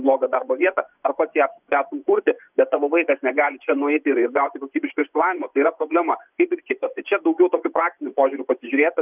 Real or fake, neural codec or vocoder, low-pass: fake; vocoder, 24 kHz, 100 mel bands, Vocos; 3.6 kHz